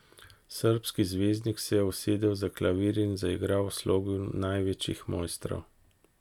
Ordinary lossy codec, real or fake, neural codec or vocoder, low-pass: none; real; none; 19.8 kHz